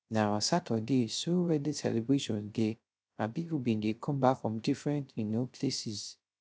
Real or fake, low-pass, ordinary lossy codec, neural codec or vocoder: fake; none; none; codec, 16 kHz, 0.3 kbps, FocalCodec